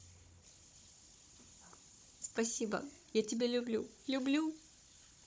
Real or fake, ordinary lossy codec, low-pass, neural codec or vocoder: fake; none; none; codec, 16 kHz, 16 kbps, FunCodec, trained on Chinese and English, 50 frames a second